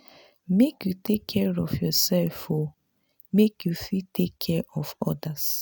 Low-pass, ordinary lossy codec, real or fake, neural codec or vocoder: none; none; real; none